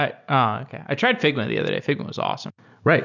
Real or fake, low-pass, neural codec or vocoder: real; 7.2 kHz; none